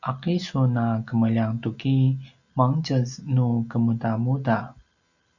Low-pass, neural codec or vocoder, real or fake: 7.2 kHz; none; real